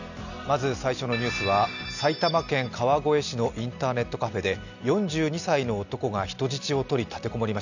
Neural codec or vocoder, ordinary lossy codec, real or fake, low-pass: none; none; real; 7.2 kHz